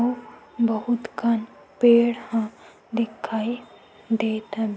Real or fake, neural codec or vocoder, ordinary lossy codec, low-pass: real; none; none; none